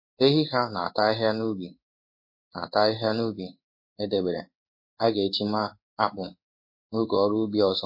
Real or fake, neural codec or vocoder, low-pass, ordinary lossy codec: fake; codec, 16 kHz in and 24 kHz out, 1 kbps, XY-Tokenizer; 5.4 kHz; MP3, 32 kbps